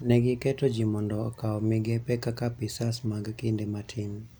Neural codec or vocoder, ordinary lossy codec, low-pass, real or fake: none; none; none; real